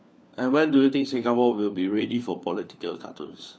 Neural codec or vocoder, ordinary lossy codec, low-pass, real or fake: codec, 16 kHz, 4 kbps, FunCodec, trained on LibriTTS, 50 frames a second; none; none; fake